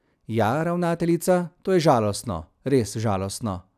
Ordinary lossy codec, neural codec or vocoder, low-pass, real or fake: none; none; 14.4 kHz; real